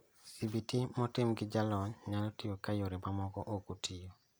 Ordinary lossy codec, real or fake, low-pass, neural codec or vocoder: none; real; none; none